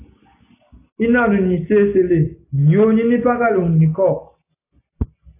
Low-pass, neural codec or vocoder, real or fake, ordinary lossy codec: 3.6 kHz; none; real; AAC, 24 kbps